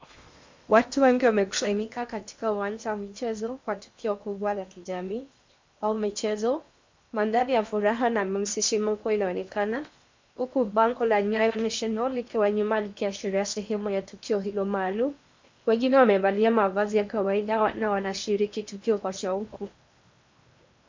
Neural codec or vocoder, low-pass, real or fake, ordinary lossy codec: codec, 16 kHz in and 24 kHz out, 0.8 kbps, FocalCodec, streaming, 65536 codes; 7.2 kHz; fake; MP3, 64 kbps